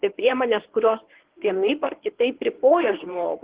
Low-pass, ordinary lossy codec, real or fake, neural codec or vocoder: 3.6 kHz; Opus, 16 kbps; fake; codec, 24 kHz, 0.9 kbps, WavTokenizer, medium speech release version 1